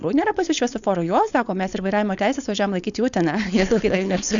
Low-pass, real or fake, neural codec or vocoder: 7.2 kHz; fake; codec, 16 kHz, 4.8 kbps, FACodec